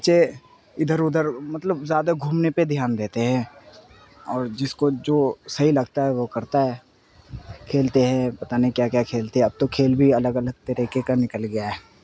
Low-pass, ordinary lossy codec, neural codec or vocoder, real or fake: none; none; none; real